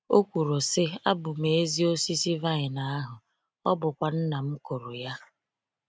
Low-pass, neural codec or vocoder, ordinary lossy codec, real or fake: none; none; none; real